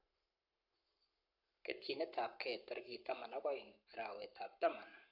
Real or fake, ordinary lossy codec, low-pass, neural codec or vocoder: fake; none; 5.4 kHz; codec, 44.1 kHz, 7.8 kbps, Pupu-Codec